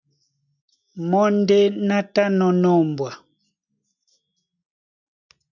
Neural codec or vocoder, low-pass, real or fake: none; 7.2 kHz; real